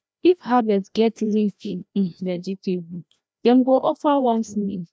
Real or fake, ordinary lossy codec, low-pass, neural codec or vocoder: fake; none; none; codec, 16 kHz, 1 kbps, FreqCodec, larger model